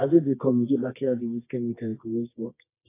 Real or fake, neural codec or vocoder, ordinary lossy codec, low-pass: fake; codec, 24 kHz, 0.9 kbps, WavTokenizer, medium music audio release; AAC, 24 kbps; 3.6 kHz